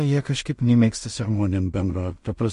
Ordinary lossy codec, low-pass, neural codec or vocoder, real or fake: MP3, 48 kbps; 10.8 kHz; codec, 16 kHz in and 24 kHz out, 0.4 kbps, LongCat-Audio-Codec, two codebook decoder; fake